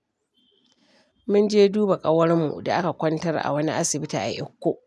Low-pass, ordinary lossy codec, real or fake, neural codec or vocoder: none; none; real; none